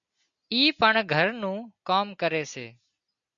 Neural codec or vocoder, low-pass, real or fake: none; 7.2 kHz; real